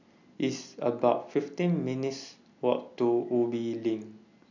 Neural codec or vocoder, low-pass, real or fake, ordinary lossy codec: none; 7.2 kHz; real; none